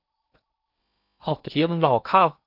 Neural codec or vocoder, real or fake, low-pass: codec, 16 kHz in and 24 kHz out, 0.8 kbps, FocalCodec, streaming, 65536 codes; fake; 5.4 kHz